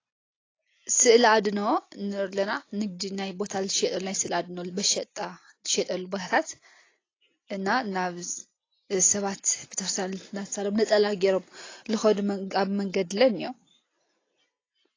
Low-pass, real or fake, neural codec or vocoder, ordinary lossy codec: 7.2 kHz; real; none; AAC, 32 kbps